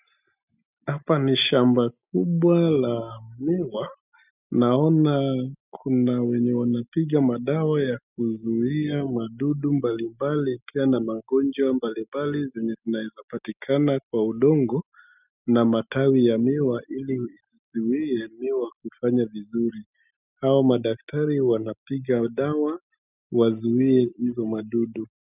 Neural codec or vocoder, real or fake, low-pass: none; real; 3.6 kHz